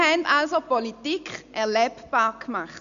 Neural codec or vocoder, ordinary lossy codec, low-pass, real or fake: none; none; 7.2 kHz; real